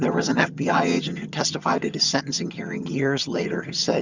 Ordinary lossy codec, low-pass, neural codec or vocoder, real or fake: Opus, 64 kbps; 7.2 kHz; vocoder, 22.05 kHz, 80 mel bands, HiFi-GAN; fake